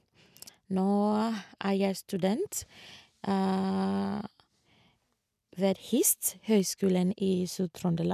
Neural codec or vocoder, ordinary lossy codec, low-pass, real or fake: none; none; 14.4 kHz; real